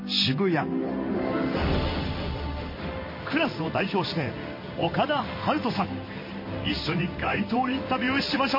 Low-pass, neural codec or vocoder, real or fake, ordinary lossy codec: 5.4 kHz; codec, 16 kHz in and 24 kHz out, 1 kbps, XY-Tokenizer; fake; MP3, 24 kbps